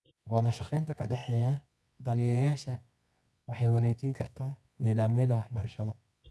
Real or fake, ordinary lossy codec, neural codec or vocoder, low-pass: fake; none; codec, 24 kHz, 0.9 kbps, WavTokenizer, medium music audio release; none